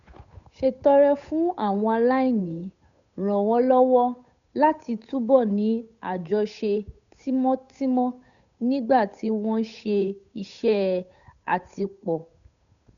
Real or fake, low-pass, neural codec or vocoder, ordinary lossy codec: fake; 7.2 kHz; codec, 16 kHz, 8 kbps, FunCodec, trained on Chinese and English, 25 frames a second; none